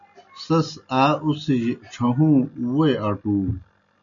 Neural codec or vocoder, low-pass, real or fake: none; 7.2 kHz; real